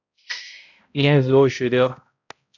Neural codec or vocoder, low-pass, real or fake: codec, 16 kHz, 0.5 kbps, X-Codec, HuBERT features, trained on balanced general audio; 7.2 kHz; fake